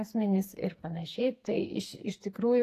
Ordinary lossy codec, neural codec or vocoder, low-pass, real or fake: AAC, 48 kbps; codec, 44.1 kHz, 2.6 kbps, SNAC; 14.4 kHz; fake